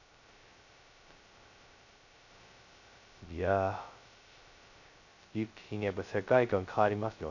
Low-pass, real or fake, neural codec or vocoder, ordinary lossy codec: 7.2 kHz; fake; codec, 16 kHz, 0.2 kbps, FocalCodec; none